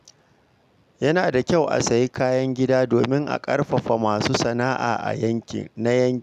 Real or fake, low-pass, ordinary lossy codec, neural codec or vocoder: real; 14.4 kHz; none; none